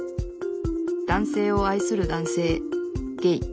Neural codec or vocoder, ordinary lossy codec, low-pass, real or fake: none; none; none; real